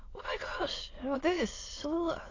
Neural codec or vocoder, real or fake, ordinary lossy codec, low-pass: autoencoder, 22.05 kHz, a latent of 192 numbers a frame, VITS, trained on many speakers; fake; AAC, 32 kbps; 7.2 kHz